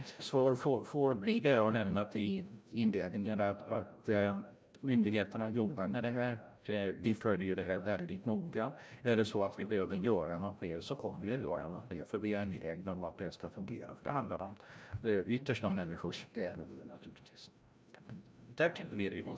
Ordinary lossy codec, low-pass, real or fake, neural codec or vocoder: none; none; fake; codec, 16 kHz, 0.5 kbps, FreqCodec, larger model